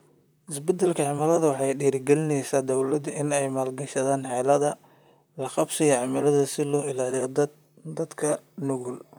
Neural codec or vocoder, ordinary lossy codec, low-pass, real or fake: vocoder, 44.1 kHz, 128 mel bands, Pupu-Vocoder; none; none; fake